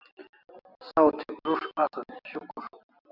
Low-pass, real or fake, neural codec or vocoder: 5.4 kHz; real; none